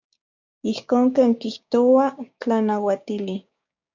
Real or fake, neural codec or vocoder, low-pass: fake; codec, 16 kHz, 6 kbps, DAC; 7.2 kHz